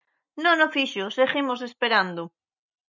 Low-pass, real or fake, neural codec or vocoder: 7.2 kHz; real; none